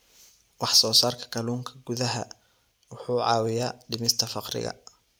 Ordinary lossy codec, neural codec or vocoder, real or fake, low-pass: none; none; real; none